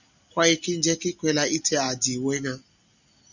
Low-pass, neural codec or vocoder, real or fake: 7.2 kHz; none; real